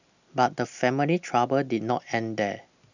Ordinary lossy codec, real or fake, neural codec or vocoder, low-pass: none; real; none; 7.2 kHz